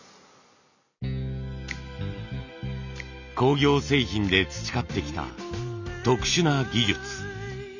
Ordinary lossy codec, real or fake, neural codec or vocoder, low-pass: none; real; none; 7.2 kHz